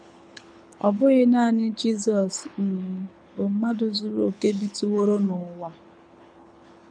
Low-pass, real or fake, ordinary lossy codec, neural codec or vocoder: 9.9 kHz; fake; none; codec, 24 kHz, 6 kbps, HILCodec